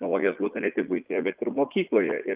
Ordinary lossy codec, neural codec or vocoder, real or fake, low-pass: Opus, 32 kbps; vocoder, 44.1 kHz, 80 mel bands, Vocos; fake; 3.6 kHz